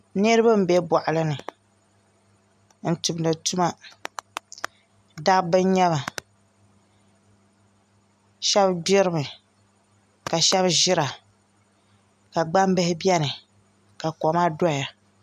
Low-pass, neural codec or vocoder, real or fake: 14.4 kHz; none; real